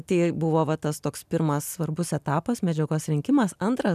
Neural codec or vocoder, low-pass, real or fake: none; 14.4 kHz; real